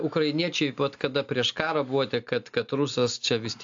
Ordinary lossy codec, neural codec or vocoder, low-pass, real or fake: AAC, 64 kbps; none; 7.2 kHz; real